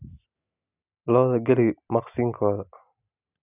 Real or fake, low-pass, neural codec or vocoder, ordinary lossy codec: real; 3.6 kHz; none; none